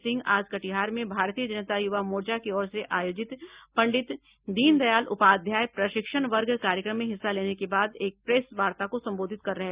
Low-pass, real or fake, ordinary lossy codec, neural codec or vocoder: 3.6 kHz; real; Opus, 64 kbps; none